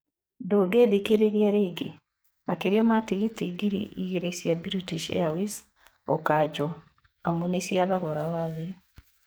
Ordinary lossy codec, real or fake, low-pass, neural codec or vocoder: none; fake; none; codec, 44.1 kHz, 2.6 kbps, SNAC